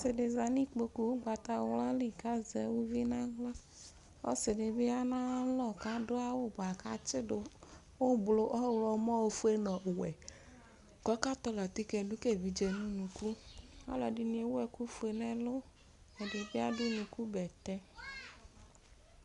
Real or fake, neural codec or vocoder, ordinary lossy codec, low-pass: real; none; Opus, 64 kbps; 10.8 kHz